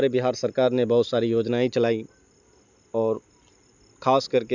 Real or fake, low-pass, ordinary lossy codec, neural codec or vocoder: real; 7.2 kHz; none; none